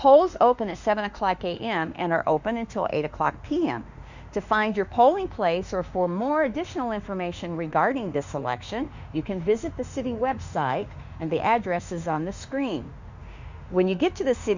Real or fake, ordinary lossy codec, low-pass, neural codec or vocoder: fake; Opus, 64 kbps; 7.2 kHz; autoencoder, 48 kHz, 32 numbers a frame, DAC-VAE, trained on Japanese speech